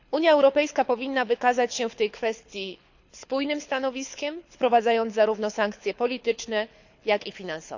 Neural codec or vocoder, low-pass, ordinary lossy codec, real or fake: codec, 24 kHz, 6 kbps, HILCodec; 7.2 kHz; none; fake